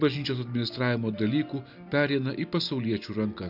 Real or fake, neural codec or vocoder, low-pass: real; none; 5.4 kHz